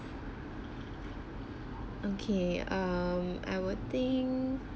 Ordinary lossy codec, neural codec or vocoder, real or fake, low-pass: none; none; real; none